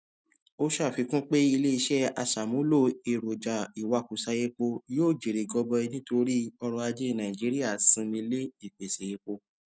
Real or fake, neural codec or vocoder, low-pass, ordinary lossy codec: real; none; none; none